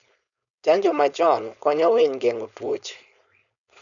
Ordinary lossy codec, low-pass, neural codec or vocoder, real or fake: none; 7.2 kHz; codec, 16 kHz, 4.8 kbps, FACodec; fake